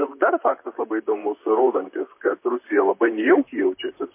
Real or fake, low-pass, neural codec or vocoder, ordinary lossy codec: fake; 3.6 kHz; vocoder, 44.1 kHz, 128 mel bands, Pupu-Vocoder; MP3, 24 kbps